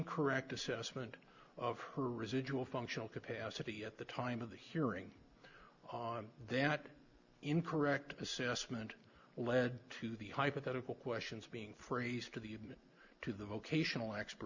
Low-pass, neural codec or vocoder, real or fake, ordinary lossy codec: 7.2 kHz; none; real; Opus, 64 kbps